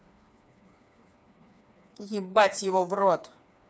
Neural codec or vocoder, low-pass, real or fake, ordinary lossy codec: codec, 16 kHz, 4 kbps, FreqCodec, smaller model; none; fake; none